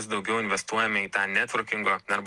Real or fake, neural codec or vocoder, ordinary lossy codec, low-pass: real; none; Opus, 24 kbps; 10.8 kHz